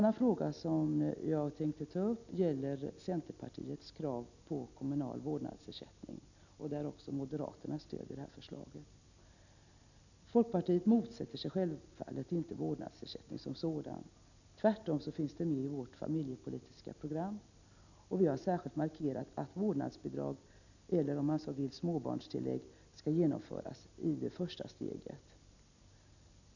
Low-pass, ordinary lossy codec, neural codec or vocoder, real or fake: 7.2 kHz; none; none; real